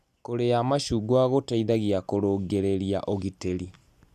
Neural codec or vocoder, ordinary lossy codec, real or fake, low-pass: none; none; real; 14.4 kHz